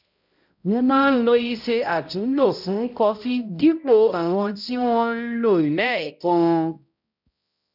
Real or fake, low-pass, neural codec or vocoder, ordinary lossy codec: fake; 5.4 kHz; codec, 16 kHz, 0.5 kbps, X-Codec, HuBERT features, trained on balanced general audio; none